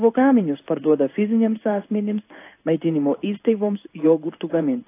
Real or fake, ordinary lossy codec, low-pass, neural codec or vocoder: fake; AAC, 24 kbps; 3.6 kHz; codec, 16 kHz in and 24 kHz out, 1 kbps, XY-Tokenizer